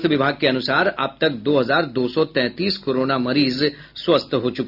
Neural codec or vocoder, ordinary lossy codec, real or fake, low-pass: none; none; real; 5.4 kHz